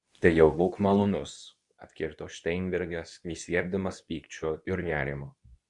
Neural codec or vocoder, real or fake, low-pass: codec, 24 kHz, 0.9 kbps, WavTokenizer, medium speech release version 2; fake; 10.8 kHz